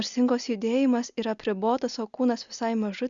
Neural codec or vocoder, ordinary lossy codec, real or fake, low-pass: none; Opus, 64 kbps; real; 7.2 kHz